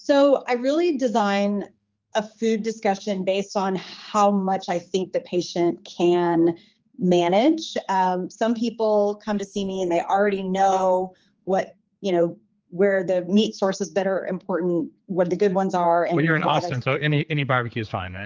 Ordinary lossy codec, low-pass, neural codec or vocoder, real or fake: Opus, 24 kbps; 7.2 kHz; codec, 16 kHz, 4 kbps, X-Codec, HuBERT features, trained on general audio; fake